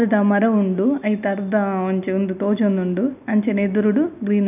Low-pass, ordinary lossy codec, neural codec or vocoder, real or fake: 3.6 kHz; none; none; real